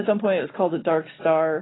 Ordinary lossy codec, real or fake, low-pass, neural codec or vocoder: AAC, 16 kbps; real; 7.2 kHz; none